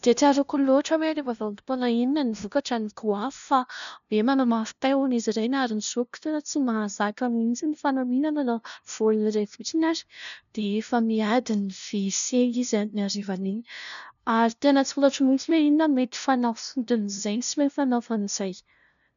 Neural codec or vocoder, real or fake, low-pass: codec, 16 kHz, 0.5 kbps, FunCodec, trained on LibriTTS, 25 frames a second; fake; 7.2 kHz